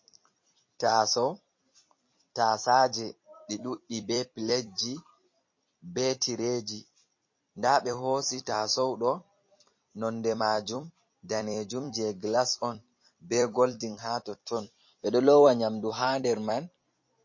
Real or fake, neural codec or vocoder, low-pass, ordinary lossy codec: fake; vocoder, 44.1 kHz, 128 mel bands every 256 samples, BigVGAN v2; 7.2 kHz; MP3, 32 kbps